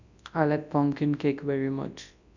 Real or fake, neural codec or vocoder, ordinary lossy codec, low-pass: fake; codec, 24 kHz, 0.9 kbps, WavTokenizer, large speech release; none; 7.2 kHz